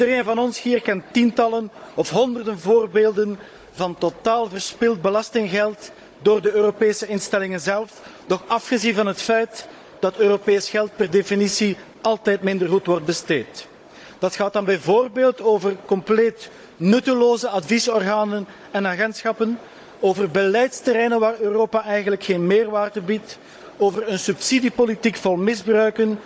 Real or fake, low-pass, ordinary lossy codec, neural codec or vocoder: fake; none; none; codec, 16 kHz, 16 kbps, FunCodec, trained on Chinese and English, 50 frames a second